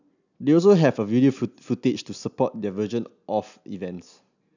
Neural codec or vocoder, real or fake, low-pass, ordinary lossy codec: none; real; 7.2 kHz; none